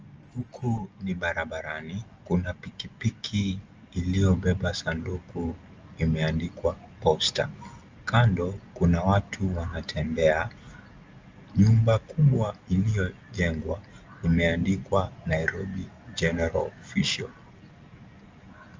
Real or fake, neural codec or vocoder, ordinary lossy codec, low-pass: real; none; Opus, 24 kbps; 7.2 kHz